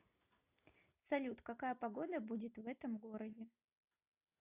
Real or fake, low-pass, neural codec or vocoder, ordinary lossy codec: real; 3.6 kHz; none; AAC, 32 kbps